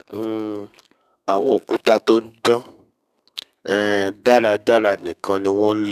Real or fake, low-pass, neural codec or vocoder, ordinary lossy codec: fake; 14.4 kHz; codec, 32 kHz, 1.9 kbps, SNAC; none